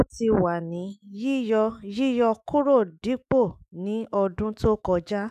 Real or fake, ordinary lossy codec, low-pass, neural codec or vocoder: fake; none; 14.4 kHz; autoencoder, 48 kHz, 128 numbers a frame, DAC-VAE, trained on Japanese speech